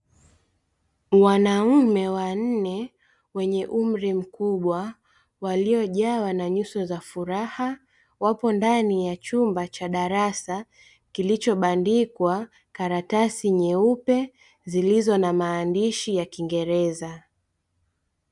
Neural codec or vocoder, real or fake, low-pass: none; real; 10.8 kHz